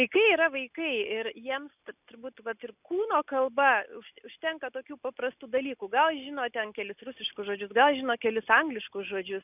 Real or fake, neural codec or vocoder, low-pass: real; none; 3.6 kHz